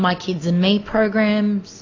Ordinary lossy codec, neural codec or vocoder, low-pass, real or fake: AAC, 32 kbps; none; 7.2 kHz; real